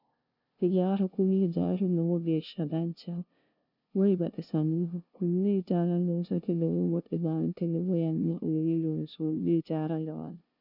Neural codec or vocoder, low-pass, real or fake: codec, 16 kHz, 0.5 kbps, FunCodec, trained on LibriTTS, 25 frames a second; 5.4 kHz; fake